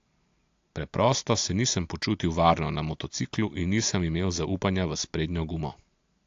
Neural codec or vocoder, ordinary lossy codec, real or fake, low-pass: none; AAC, 48 kbps; real; 7.2 kHz